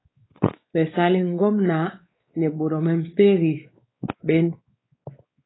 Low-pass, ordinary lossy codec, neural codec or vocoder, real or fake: 7.2 kHz; AAC, 16 kbps; codec, 16 kHz, 4 kbps, X-Codec, WavLM features, trained on Multilingual LibriSpeech; fake